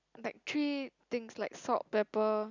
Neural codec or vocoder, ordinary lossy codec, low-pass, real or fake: none; none; 7.2 kHz; real